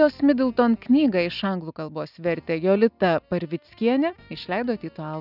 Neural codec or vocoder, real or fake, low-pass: none; real; 5.4 kHz